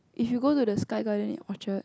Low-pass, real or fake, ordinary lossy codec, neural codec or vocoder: none; real; none; none